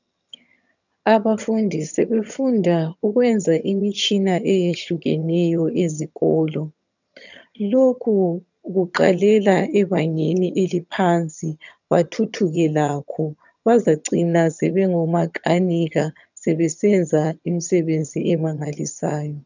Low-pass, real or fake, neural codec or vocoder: 7.2 kHz; fake; vocoder, 22.05 kHz, 80 mel bands, HiFi-GAN